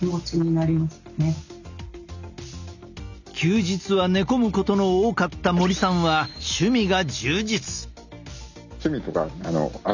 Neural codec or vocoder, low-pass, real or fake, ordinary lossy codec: none; 7.2 kHz; real; none